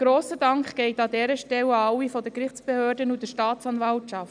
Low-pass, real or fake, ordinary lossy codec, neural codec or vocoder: 9.9 kHz; real; none; none